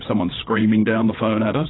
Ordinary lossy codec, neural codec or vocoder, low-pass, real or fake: AAC, 16 kbps; codec, 16 kHz, 8 kbps, FreqCodec, larger model; 7.2 kHz; fake